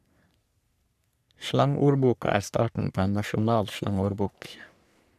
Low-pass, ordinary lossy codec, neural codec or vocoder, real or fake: 14.4 kHz; none; codec, 44.1 kHz, 3.4 kbps, Pupu-Codec; fake